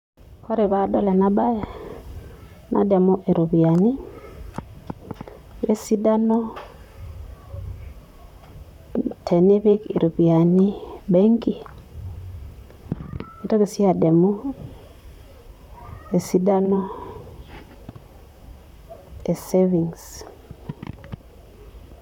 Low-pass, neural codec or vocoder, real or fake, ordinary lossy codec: 19.8 kHz; vocoder, 44.1 kHz, 128 mel bands, Pupu-Vocoder; fake; none